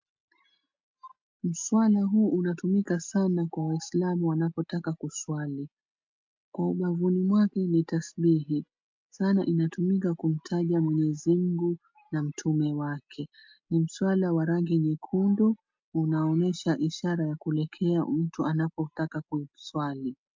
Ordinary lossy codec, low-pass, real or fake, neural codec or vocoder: MP3, 64 kbps; 7.2 kHz; real; none